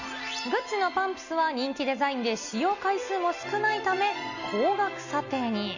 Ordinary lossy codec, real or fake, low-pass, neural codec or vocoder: none; real; 7.2 kHz; none